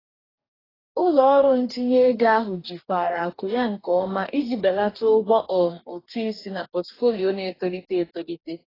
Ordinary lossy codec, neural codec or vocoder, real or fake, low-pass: AAC, 24 kbps; codec, 44.1 kHz, 2.6 kbps, DAC; fake; 5.4 kHz